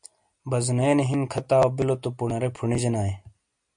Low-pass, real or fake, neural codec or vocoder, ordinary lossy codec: 9.9 kHz; real; none; MP3, 64 kbps